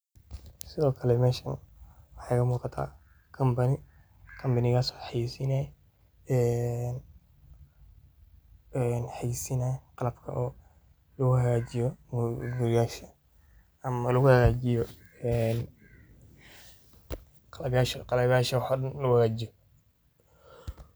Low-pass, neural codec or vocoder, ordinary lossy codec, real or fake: none; none; none; real